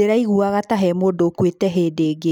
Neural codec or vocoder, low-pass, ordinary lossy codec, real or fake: none; 19.8 kHz; none; real